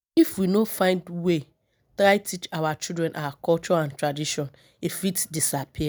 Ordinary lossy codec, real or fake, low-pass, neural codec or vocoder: none; real; none; none